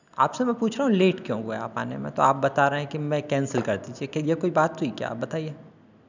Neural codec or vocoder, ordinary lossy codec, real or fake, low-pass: none; none; real; 7.2 kHz